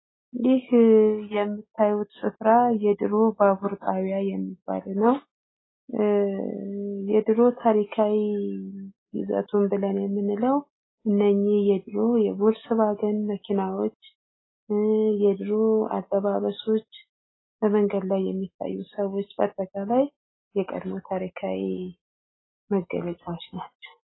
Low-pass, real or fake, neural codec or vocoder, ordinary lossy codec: 7.2 kHz; real; none; AAC, 16 kbps